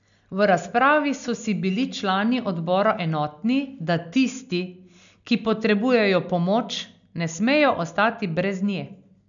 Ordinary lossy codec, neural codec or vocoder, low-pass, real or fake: none; none; 7.2 kHz; real